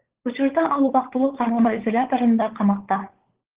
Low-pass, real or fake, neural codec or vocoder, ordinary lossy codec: 3.6 kHz; fake; codec, 16 kHz, 16 kbps, FunCodec, trained on LibriTTS, 50 frames a second; Opus, 16 kbps